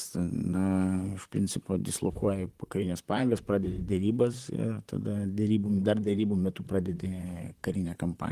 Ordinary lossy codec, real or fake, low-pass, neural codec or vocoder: Opus, 24 kbps; fake; 14.4 kHz; vocoder, 44.1 kHz, 128 mel bands, Pupu-Vocoder